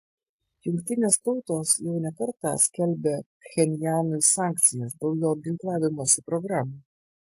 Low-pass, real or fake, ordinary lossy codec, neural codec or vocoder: 14.4 kHz; real; AAC, 64 kbps; none